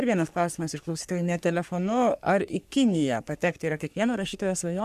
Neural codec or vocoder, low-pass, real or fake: codec, 44.1 kHz, 3.4 kbps, Pupu-Codec; 14.4 kHz; fake